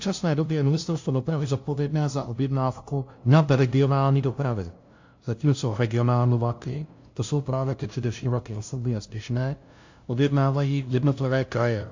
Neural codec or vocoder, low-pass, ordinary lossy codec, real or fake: codec, 16 kHz, 0.5 kbps, FunCodec, trained on LibriTTS, 25 frames a second; 7.2 kHz; AAC, 48 kbps; fake